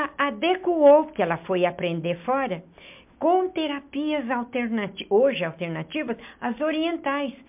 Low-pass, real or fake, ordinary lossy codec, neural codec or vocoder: 3.6 kHz; real; MP3, 32 kbps; none